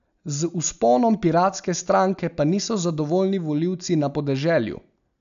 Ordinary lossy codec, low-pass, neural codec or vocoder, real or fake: none; 7.2 kHz; none; real